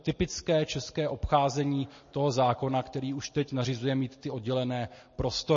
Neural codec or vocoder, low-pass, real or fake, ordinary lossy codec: none; 7.2 kHz; real; MP3, 32 kbps